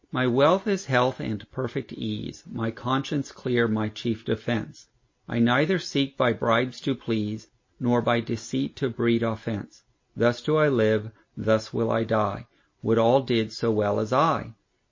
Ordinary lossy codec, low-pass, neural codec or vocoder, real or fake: MP3, 32 kbps; 7.2 kHz; none; real